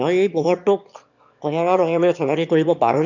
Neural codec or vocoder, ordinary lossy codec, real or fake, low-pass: autoencoder, 22.05 kHz, a latent of 192 numbers a frame, VITS, trained on one speaker; none; fake; 7.2 kHz